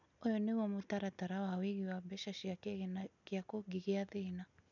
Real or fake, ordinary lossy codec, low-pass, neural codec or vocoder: real; none; 7.2 kHz; none